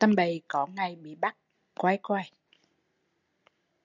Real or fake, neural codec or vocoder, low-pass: real; none; 7.2 kHz